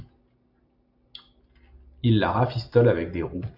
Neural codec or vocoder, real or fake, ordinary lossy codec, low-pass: none; real; AAC, 48 kbps; 5.4 kHz